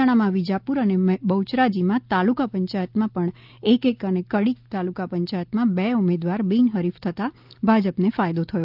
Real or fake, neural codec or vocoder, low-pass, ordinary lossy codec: real; none; 5.4 kHz; Opus, 24 kbps